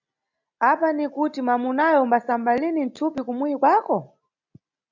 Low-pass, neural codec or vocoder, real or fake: 7.2 kHz; none; real